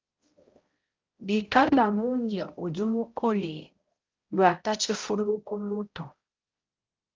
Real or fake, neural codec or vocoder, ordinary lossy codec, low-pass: fake; codec, 16 kHz, 0.5 kbps, X-Codec, HuBERT features, trained on balanced general audio; Opus, 32 kbps; 7.2 kHz